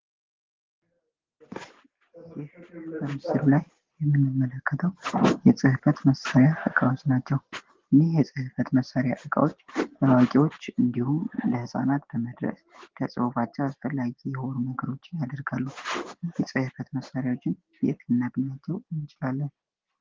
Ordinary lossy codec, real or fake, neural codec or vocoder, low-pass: Opus, 16 kbps; real; none; 7.2 kHz